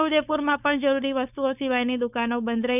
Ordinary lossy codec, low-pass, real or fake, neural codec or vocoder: none; 3.6 kHz; fake; codec, 16 kHz, 4.8 kbps, FACodec